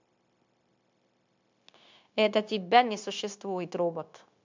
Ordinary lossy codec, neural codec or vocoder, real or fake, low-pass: MP3, 64 kbps; codec, 16 kHz, 0.9 kbps, LongCat-Audio-Codec; fake; 7.2 kHz